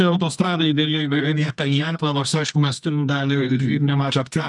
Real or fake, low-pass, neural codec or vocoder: fake; 10.8 kHz; codec, 24 kHz, 0.9 kbps, WavTokenizer, medium music audio release